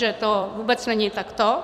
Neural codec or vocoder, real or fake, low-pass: vocoder, 44.1 kHz, 128 mel bands every 256 samples, BigVGAN v2; fake; 14.4 kHz